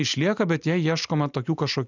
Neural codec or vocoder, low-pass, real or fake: none; 7.2 kHz; real